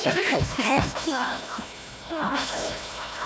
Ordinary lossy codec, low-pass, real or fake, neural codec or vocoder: none; none; fake; codec, 16 kHz, 1 kbps, FunCodec, trained on Chinese and English, 50 frames a second